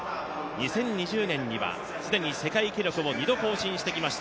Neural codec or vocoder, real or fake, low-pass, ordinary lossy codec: none; real; none; none